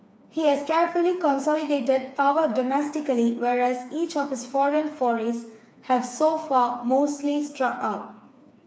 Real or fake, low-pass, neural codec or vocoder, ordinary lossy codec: fake; none; codec, 16 kHz, 4 kbps, FreqCodec, smaller model; none